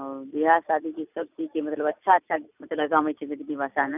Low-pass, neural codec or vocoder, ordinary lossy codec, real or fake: 3.6 kHz; none; none; real